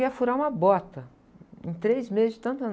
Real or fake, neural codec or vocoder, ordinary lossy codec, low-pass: real; none; none; none